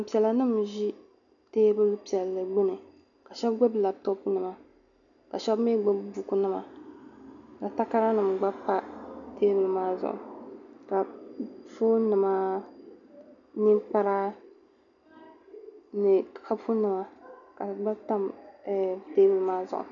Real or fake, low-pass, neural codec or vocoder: real; 7.2 kHz; none